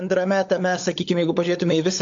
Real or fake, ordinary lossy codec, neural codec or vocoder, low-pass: fake; AAC, 48 kbps; codec, 16 kHz, 16 kbps, FunCodec, trained on LibriTTS, 50 frames a second; 7.2 kHz